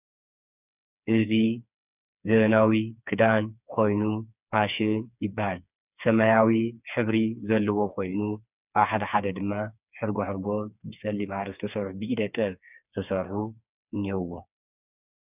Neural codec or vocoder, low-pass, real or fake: codec, 16 kHz, 4 kbps, FreqCodec, smaller model; 3.6 kHz; fake